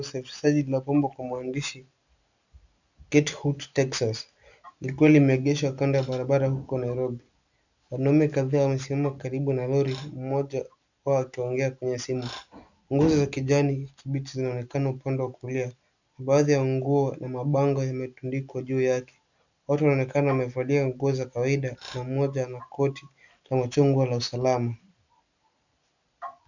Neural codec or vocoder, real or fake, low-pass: none; real; 7.2 kHz